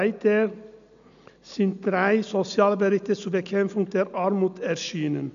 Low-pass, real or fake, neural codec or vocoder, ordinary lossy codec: 7.2 kHz; real; none; none